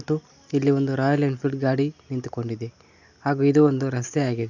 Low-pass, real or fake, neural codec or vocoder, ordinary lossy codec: 7.2 kHz; real; none; none